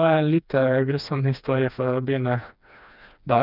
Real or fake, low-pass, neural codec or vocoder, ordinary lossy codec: fake; 5.4 kHz; codec, 16 kHz, 2 kbps, FreqCodec, smaller model; none